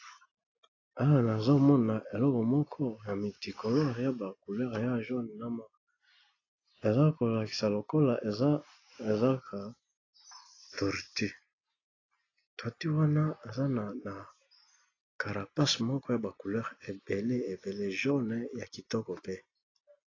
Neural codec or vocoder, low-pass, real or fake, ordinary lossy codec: none; 7.2 kHz; real; AAC, 32 kbps